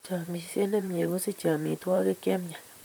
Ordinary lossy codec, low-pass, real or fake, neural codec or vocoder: none; none; fake; vocoder, 44.1 kHz, 128 mel bands, Pupu-Vocoder